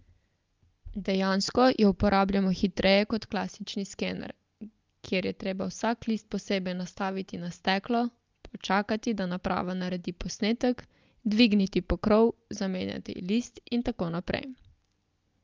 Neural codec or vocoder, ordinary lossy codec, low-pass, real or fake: none; Opus, 24 kbps; 7.2 kHz; real